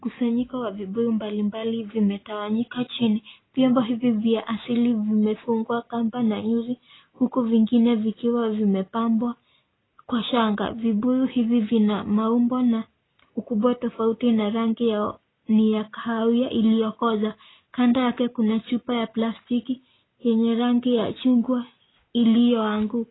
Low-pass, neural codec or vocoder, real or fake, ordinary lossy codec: 7.2 kHz; none; real; AAC, 16 kbps